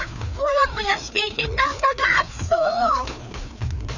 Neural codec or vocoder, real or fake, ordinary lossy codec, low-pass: codec, 16 kHz, 2 kbps, FreqCodec, larger model; fake; none; 7.2 kHz